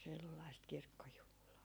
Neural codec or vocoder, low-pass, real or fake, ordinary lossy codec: none; none; real; none